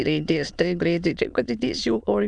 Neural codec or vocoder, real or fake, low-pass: autoencoder, 22.05 kHz, a latent of 192 numbers a frame, VITS, trained on many speakers; fake; 9.9 kHz